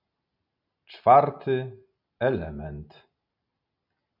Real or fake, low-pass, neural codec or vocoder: real; 5.4 kHz; none